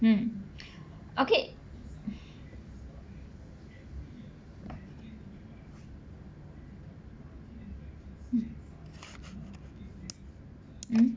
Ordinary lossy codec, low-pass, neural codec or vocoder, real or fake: none; none; none; real